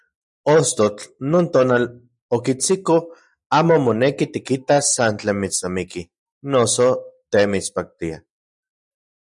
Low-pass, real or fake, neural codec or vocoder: 10.8 kHz; real; none